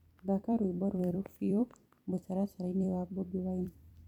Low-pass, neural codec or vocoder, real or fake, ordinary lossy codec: 19.8 kHz; vocoder, 44.1 kHz, 128 mel bands every 256 samples, BigVGAN v2; fake; none